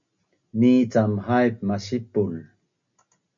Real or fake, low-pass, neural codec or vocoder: real; 7.2 kHz; none